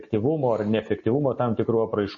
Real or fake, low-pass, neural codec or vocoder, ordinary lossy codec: real; 7.2 kHz; none; MP3, 32 kbps